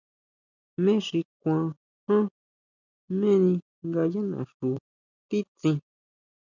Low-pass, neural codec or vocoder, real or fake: 7.2 kHz; none; real